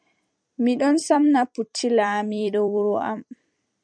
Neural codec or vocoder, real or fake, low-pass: vocoder, 44.1 kHz, 128 mel bands every 256 samples, BigVGAN v2; fake; 9.9 kHz